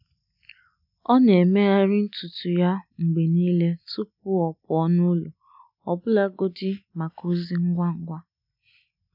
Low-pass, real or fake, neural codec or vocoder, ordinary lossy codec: 5.4 kHz; real; none; none